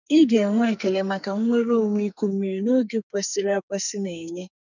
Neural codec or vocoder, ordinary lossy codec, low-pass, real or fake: codec, 44.1 kHz, 2.6 kbps, SNAC; none; 7.2 kHz; fake